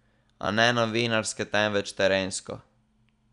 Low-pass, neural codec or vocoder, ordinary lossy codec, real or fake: 10.8 kHz; none; none; real